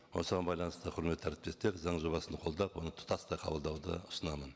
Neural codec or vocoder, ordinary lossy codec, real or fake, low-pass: none; none; real; none